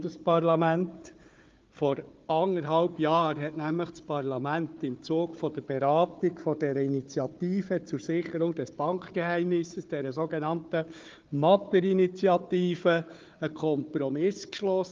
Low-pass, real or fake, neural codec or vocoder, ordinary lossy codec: 7.2 kHz; fake; codec, 16 kHz, 4 kbps, FunCodec, trained on Chinese and English, 50 frames a second; Opus, 32 kbps